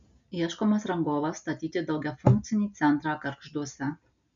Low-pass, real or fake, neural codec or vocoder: 7.2 kHz; real; none